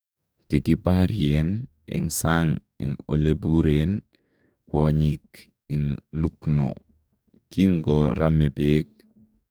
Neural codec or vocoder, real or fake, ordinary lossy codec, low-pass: codec, 44.1 kHz, 2.6 kbps, DAC; fake; none; none